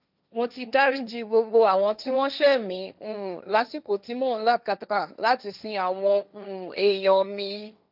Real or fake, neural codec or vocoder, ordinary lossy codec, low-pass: fake; codec, 16 kHz, 1.1 kbps, Voila-Tokenizer; none; 5.4 kHz